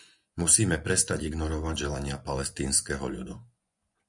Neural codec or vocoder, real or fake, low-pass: vocoder, 24 kHz, 100 mel bands, Vocos; fake; 10.8 kHz